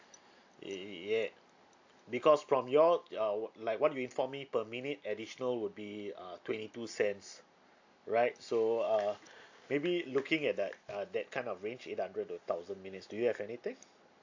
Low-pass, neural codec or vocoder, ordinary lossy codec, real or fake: 7.2 kHz; none; AAC, 48 kbps; real